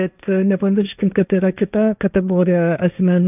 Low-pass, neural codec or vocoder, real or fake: 3.6 kHz; codec, 16 kHz, 1.1 kbps, Voila-Tokenizer; fake